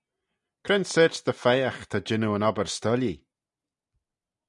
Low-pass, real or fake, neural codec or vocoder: 10.8 kHz; real; none